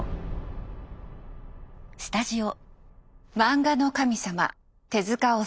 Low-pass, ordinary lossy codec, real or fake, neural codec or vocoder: none; none; real; none